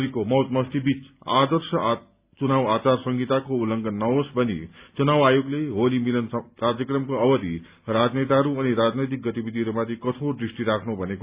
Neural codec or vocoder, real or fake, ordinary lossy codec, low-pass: none; real; Opus, 64 kbps; 3.6 kHz